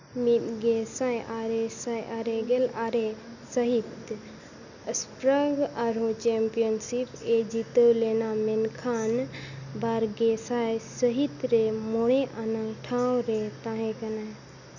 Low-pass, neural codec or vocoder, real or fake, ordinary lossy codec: 7.2 kHz; none; real; none